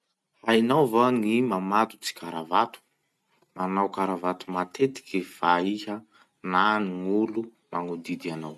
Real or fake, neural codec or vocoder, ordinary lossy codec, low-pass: real; none; none; none